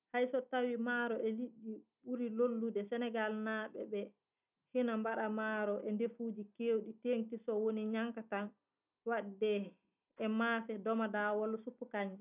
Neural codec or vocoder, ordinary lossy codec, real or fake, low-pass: none; none; real; 3.6 kHz